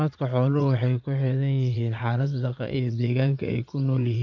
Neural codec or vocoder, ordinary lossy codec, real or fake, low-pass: vocoder, 44.1 kHz, 80 mel bands, Vocos; none; fake; 7.2 kHz